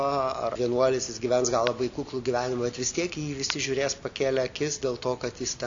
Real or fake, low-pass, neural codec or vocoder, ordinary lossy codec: real; 7.2 kHz; none; AAC, 64 kbps